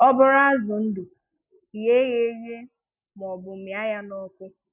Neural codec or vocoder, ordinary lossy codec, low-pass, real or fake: none; none; 3.6 kHz; real